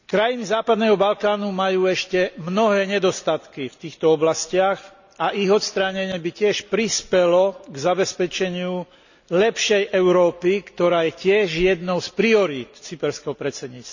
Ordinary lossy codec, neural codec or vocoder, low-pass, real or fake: none; none; 7.2 kHz; real